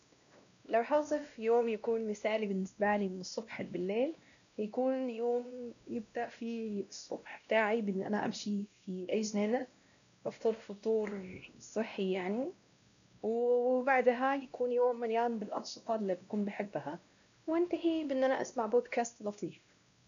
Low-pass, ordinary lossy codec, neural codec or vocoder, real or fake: 7.2 kHz; none; codec, 16 kHz, 1 kbps, X-Codec, WavLM features, trained on Multilingual LibriSpeech; fake